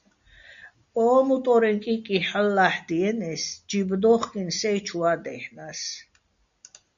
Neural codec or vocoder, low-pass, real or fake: none; 7.2 kHz; real